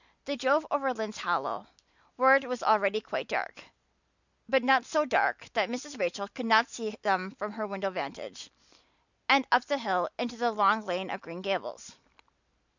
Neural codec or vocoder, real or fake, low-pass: none; real; 7.2 kHz